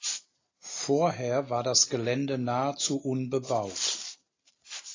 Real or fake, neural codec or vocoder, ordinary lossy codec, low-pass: real; none; AAC, 32 kbps; 7.2 kHz